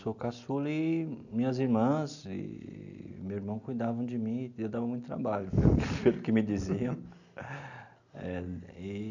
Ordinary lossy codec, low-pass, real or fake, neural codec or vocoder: none; 7.2 kHz; real; none